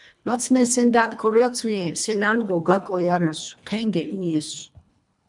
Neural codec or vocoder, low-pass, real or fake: codec, 24 kHz, 1.5 kbps, HILCodec; 10.8 kHz; fake